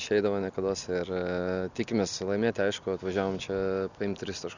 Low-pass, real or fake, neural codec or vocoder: 7.2 kHz; real; none